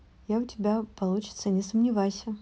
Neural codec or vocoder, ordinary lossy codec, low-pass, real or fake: none; none; none; real